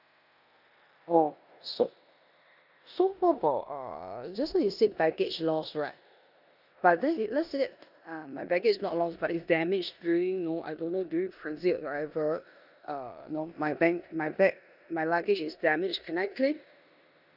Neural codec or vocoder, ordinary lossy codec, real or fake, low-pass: codec, 16 kHz in and 24 kHz out, 0.9 kbps, LongCat-Audio-Codec, four codebook decoder; none; fake; 5.4 kHz